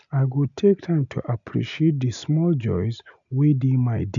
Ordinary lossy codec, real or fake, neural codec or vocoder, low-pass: none; real; none; 7.2 kHz